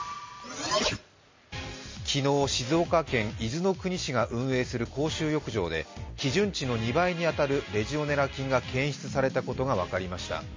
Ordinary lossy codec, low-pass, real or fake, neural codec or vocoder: MP3, 32 kbps; 7.2 kHz; real; none